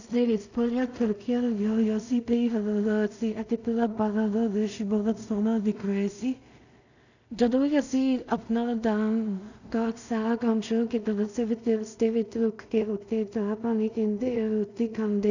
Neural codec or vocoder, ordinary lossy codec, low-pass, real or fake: codec, 16 kHz in and 24 kHz out, 0.4 kbps, LongCat-Audio-Codec, two codebook decoder; none; 7.2 kHz; fake